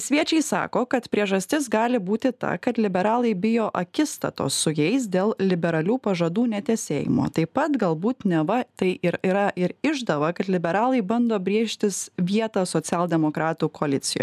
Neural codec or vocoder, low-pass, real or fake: none; 14.4 kHz; real